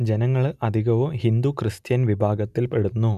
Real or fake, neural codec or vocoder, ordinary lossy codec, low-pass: real; none; none; 14.4 kHz